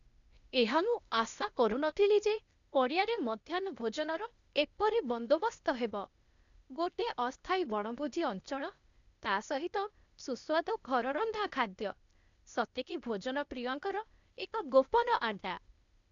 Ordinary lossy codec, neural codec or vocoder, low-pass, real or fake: none; codec, 16 kHz, 0.8 kbps, ZipCodec; 7.2 kHz; fake